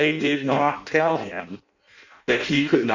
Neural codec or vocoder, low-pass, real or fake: codec, 16 kHz in and 24 kHz out, 0.6 kbps, FireRedTTS-2 codec; 7.2 kHz; fake